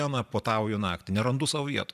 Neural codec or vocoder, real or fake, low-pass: vocoder, 44.1 kHz, 128 mel bands every 512 samples, BigVGAN v2; fake; 14.4 kHz